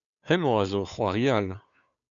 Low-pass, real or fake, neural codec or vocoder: 7.2 kHz; fake; codec, 16 kHz, 2 kbps, FunCodec, trained on Chinese and English, 25 frames a second